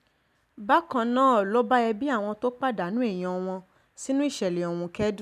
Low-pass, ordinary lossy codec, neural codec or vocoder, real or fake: 14.4 kHz; none; none; real